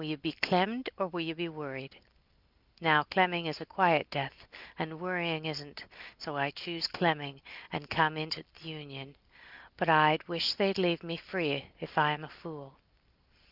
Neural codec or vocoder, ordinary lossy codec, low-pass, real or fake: none; Opus, 32 kbps; 5.4 kHz; real